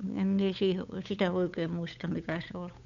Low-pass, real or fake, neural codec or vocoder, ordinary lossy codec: 7.2 kHz; fake; codec, 16 kHz, 8 kbps, FunCodec, trained on Chinese and English, 25 frames a second; none